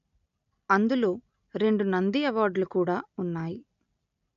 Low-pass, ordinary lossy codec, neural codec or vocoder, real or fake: 7.2 kHz; none; none; real